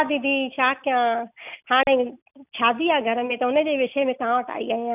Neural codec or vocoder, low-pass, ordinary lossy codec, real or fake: none; 3.6 kHz; none; real